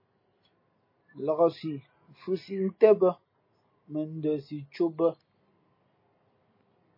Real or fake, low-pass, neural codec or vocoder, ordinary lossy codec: real; 5.4 kHz; none; MP3, 24 kbps